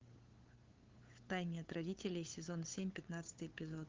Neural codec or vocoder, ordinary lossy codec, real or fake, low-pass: codec, 16 kHz, 8 kbps, FunCodec, trained on LibriTTS, 25 frames a second; Opus, 16 kbps; fake; 7.2 kHz